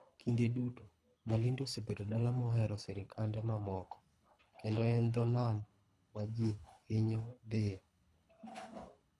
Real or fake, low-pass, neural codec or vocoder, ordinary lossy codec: fake; none; codec, 24 kHz, 3 kbps, HILCodec; none